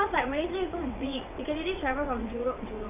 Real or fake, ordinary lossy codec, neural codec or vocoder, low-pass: fake; none; vocoder, 22.05 kHz, 80 mel bands, WaveNeXt; 3.6 kHz